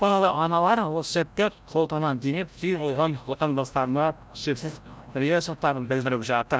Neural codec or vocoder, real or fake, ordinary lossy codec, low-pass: codec, 16 kHz, 0.5 kbps, FreqCodec, larger model; fake; none; none